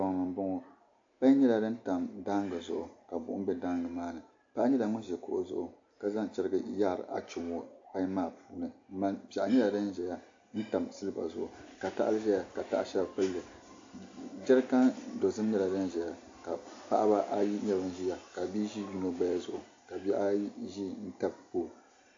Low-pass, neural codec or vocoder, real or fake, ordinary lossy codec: 7.2 kHz; none; real; AAC, 48 kbps